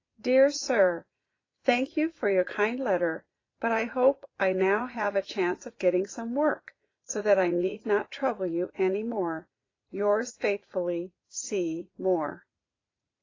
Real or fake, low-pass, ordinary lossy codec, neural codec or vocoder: fake; 7.2 kHz; AAC, 32 kbps; vocoder, 44.1 kHz, 80 mel bands, Vocos